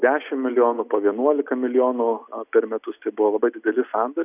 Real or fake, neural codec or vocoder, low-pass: real; none; 3.6 kHz